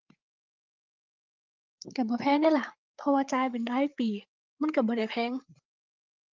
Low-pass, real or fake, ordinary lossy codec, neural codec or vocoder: 7.2 kHz; fake; Opus, 24 kbps; codec, 16 kHz, 8 kbps, FreqCodec, larger model